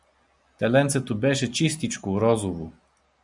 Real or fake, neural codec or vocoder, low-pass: real; none; 10.8 kHz